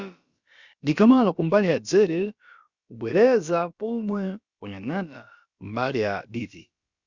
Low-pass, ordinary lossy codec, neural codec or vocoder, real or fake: 7.2 kHz; Opus, 64 kbps; codec, 16 kHz, about 1 kbps, DyCAST, with the encoder's durations; fake